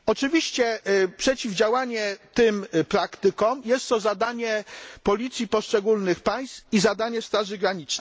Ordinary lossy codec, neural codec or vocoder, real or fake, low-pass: none; none; real; none